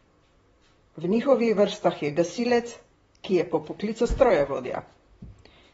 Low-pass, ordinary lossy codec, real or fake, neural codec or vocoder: 19.8 kHz; AAC, 24 kbps; fake; vocoder, 44.1 kHz, 128 mel bands, Pupu-Vocoder